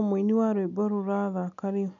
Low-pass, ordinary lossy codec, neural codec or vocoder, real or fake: 7.2 kHz; none; none; real